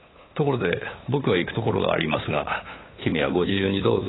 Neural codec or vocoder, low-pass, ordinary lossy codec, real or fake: codec, 16 kHz, 8 kbps, FunCodec, trained on LibriTTS, 25 frames a second; 7.2 kHz; AAC, 16 kbps; fake